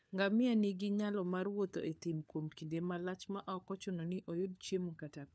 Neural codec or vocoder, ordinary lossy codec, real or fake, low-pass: codec, 16 kHz, 4 kbps, FunCodec, trained on LibriTTS, 50 frames a second; none; fake; none